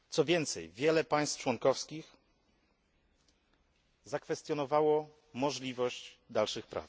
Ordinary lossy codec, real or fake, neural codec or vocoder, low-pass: none; real; none; none